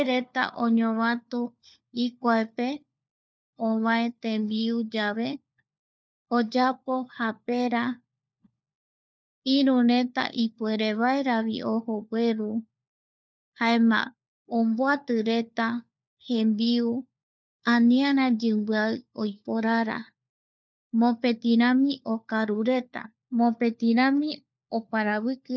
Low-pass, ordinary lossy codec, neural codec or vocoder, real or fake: none; none; codec, 16 kHz, 4 kbps, FunCodec, trained on LibriTTS, 50 frames a second; fake